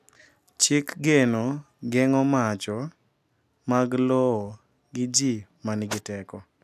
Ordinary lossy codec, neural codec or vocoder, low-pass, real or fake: none; none; 14.4 kHz; real